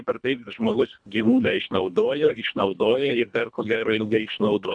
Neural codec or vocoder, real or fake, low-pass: codec, 24 kHz, 1.5 kbps, HILCodec; fake; 9.9 kHz